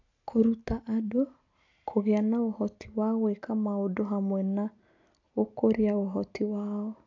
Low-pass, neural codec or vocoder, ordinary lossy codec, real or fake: 7.2 kHz; none; AAC, 48 kbps; real